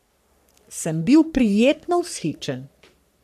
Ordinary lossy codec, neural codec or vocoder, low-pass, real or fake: none; codec, 44.1 kHz, 3.4 kbps, Pupu-Codec; 14.4 kHz; fake